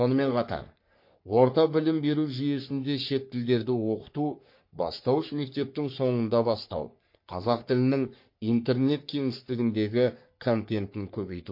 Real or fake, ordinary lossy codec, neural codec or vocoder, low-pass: fake; MP3, 32 kbps; codec, 44.1 kHz, 3.4 kbps, Pupu-Codec; 5.4 kHz